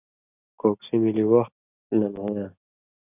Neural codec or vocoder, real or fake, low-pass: none; real; 3.6 kHz